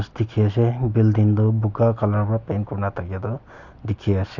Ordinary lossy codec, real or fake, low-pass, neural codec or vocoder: none; real; 7.2 kHz; none